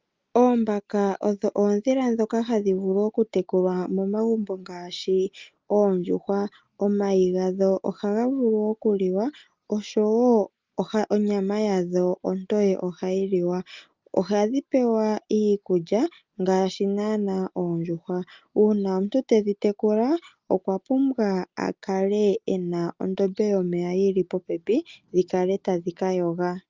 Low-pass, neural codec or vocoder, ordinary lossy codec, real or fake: 7.2 kHz; none; Opus, 24 kbps; real